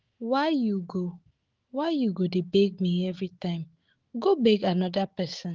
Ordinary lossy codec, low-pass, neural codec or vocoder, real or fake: Opus, 16 kbps; 7.2 kHz; autoencoder, 48 kHz, 128 numbers a frame, DAC-VAE, trained on Japanese speech; fake